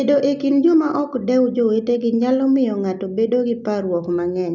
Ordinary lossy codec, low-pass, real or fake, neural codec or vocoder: none; 7.2 kHz; real; none